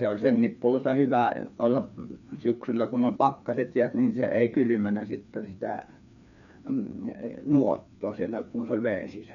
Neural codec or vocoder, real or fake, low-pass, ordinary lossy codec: codec, 16 kHz, 2 kbps, FreqCodec, larger model; fake; 7.2 kHz; none